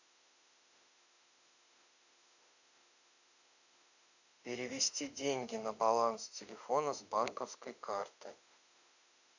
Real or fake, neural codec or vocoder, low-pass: fake; autoencoder, 48 kHz, 32 numbers a frame, DAC-VAE, trained on Japanese speech; 7.2 kHz